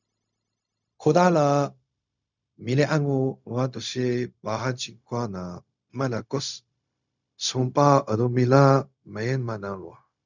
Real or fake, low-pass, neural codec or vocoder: fake; 7.2 kHz; codec, 16 kHz, 0.4 kbps, LongCat-Audio-Codec